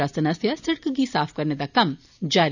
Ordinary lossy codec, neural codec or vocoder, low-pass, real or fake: none; none; 7.2 kHz; real